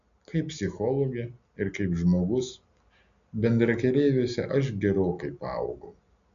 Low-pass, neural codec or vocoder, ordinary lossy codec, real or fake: 7.2 kHz; none; MP3, 96 kbps; real